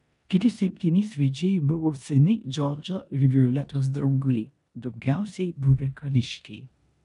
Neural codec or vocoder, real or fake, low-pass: codec, 16 kHz in and 24 kHz out, 0.9 kbps, LongCat-Audio-Codec, four codebook decoder; fake; 10.8 kHz